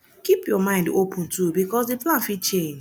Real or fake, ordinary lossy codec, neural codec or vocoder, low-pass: real; none; none; none